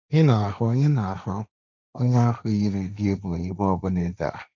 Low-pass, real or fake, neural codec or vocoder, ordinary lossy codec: 7.2 kHz; fake; codec, 16 kHz, 1.1 kbps, Voila-Tokenizer; none